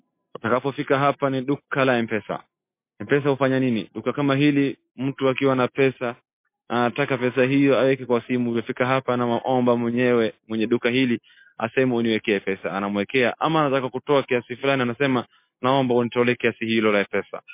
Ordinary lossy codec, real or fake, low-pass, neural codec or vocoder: MP3, 24 kbps; real; 3.6 kHz; none